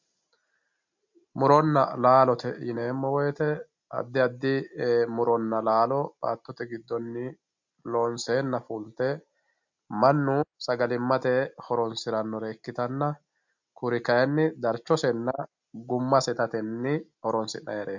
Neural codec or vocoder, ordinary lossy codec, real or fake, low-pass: none; MP3, 64 kbps; real; 7.2 kHz